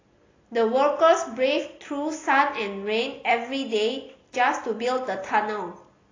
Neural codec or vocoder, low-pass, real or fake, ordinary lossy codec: none; 7.2 kHz; real; AAC, 32 kbps